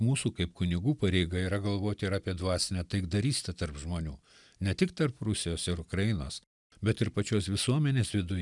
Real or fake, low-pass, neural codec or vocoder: real; 10.8 kHz; none